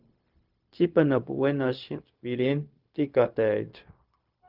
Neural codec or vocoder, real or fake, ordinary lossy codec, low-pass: codec, 16 kHz, 0.4 kbps, LongCat-Audio-Codec; fake; Opus, 24 kbps; 5.4 kHz